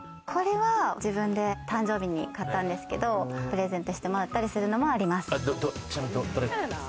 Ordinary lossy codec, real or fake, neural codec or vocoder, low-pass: none; real; none; none